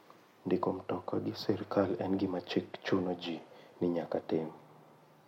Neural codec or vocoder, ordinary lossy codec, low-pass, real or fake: none; MP3, 64 kbps; 19.8 kHz; real